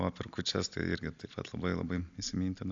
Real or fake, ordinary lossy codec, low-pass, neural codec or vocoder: real; AAC, 64 kbps; 7.2 kHz; none